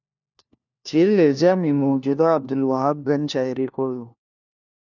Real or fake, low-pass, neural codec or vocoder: fake; 7.2 kHz; codec, 16 kHz, 1 kbps, FunCodec, trained on LibriTTS, 50 frames a second